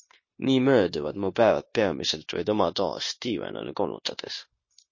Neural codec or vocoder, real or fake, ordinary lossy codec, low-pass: codec, 16 kHz, 0.9 kbps, LongCat-Audio-Codec; fake; MP3, 32 kbps; 7.2 kHz